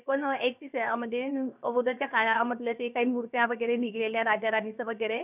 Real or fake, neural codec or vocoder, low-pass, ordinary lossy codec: fake; codec, 16 kHz, 0.7 kbps, FocalCodec; 3.6 kHz; none